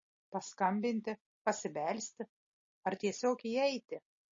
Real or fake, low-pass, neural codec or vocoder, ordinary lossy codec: real; 7.2 kHz; none; MP3, 32 kbps